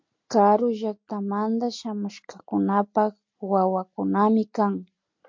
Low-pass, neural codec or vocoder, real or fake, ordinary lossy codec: 7.2 kHz; none; real; MP3, 48 kbps